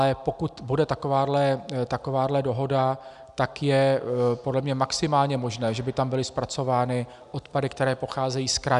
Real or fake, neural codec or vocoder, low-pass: real; none; 10.8 kHz